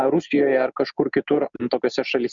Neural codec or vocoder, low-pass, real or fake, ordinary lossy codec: none; 7.2 kHz; real; Opus, 64 kbps